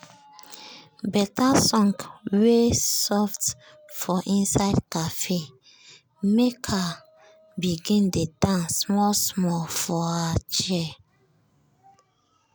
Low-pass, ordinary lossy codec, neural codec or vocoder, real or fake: none; none; none; real